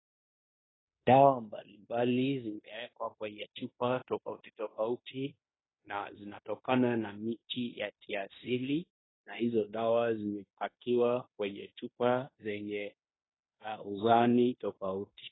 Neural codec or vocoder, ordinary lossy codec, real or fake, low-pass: codec, 16 kHz in and 24 kHz out, 0.9 kbps, LongCat-Audio-Codec, fine tuned four codebook decoder; AAC, 16 kbps; fake; 7.2 kHz